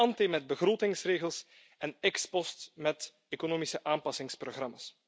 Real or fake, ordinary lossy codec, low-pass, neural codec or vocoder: real; none; none; none